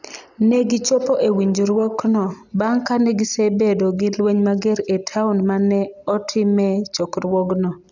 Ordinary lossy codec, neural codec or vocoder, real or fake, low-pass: none; none; real; 7.2 kHz